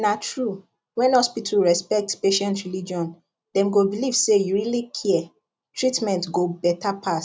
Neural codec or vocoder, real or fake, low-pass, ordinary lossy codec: none; real; none; none